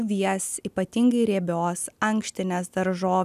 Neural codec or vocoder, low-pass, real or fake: none; 14.4 kHz; real